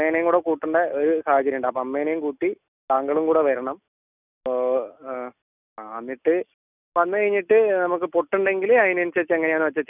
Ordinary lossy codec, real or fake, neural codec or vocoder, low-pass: none; real; none; 3.6 kHz